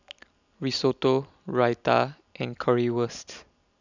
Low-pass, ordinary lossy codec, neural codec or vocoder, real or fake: 7.2 kHz; none; none; real